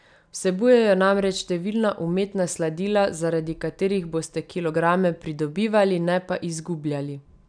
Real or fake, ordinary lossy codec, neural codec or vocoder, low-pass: real; none; none; 9.9 kHz